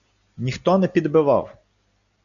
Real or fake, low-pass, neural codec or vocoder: real; 7.2 kHz; none